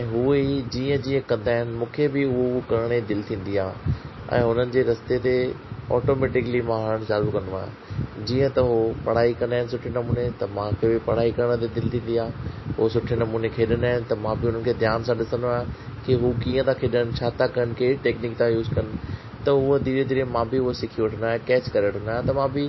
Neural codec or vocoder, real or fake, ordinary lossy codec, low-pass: none; real; MP3, 24 kbps; 7.2 kHz